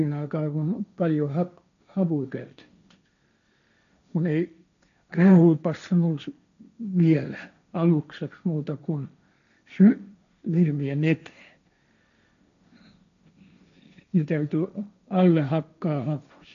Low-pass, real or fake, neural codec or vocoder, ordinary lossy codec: 7.2 kHz; fake; codec, 16 kHz, 1.1 kbps, Voila-Tokenizer; none